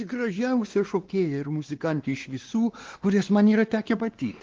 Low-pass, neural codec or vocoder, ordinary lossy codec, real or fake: 7.2 kHz; codec, 16 kHz, 2 kbps, X-Codec, WavLM features, trained on Multilingual LibriSpeech; Opus, 16 kbps; fake